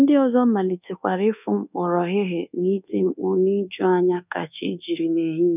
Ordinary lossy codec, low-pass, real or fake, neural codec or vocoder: none; 3.6 kHz; fake; codec, 24 kHz, 1.2 kbps, DualCodec